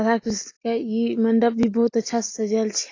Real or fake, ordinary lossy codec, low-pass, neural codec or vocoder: real; AAC, 32 kbps; 7.2 kHz; none